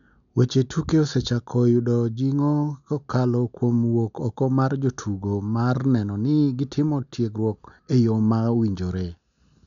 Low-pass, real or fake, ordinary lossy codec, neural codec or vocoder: 7.2 kHz; real; none; none